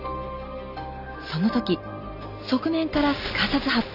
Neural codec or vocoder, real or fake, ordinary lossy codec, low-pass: none; real; none; 5.4 kHz